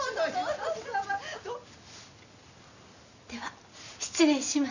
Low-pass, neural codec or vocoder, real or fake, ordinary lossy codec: 7.2 kHz; none; real; none